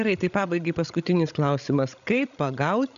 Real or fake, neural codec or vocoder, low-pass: fake; codec, 16 kHz, 16 kbps, FreqCodec, larger model; 7.2 kHz